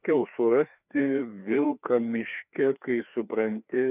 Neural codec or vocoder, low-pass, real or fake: codec, 16 kHz, 2 kbps, FreqCodec, larger model; 3.6 kHz; fake